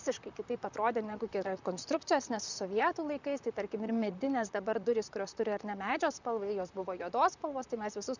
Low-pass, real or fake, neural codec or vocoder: 7.2 kHz; fake; vocoder, 44.1 kHz, 128 mel bands, Pupu-Vocoder